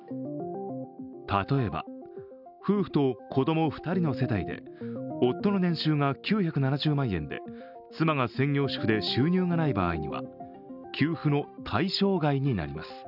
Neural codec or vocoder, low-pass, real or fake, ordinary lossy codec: none; 5.4 kHz; real; none